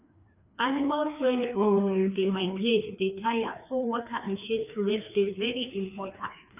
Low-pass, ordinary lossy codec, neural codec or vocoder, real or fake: 3.6 kHz; none; codec, 16 kHz, 2 kbps, FreqCodec, larger model; fake